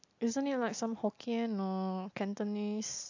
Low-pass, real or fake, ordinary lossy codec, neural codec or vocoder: 7.2 kHz; real; AAC, 48 kbps; none